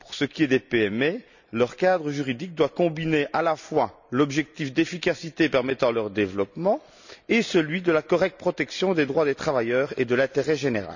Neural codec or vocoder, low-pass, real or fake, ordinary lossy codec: none; 7.2 kHz; real; none